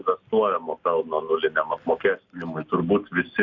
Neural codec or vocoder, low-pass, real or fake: none; 7.2 kHz; real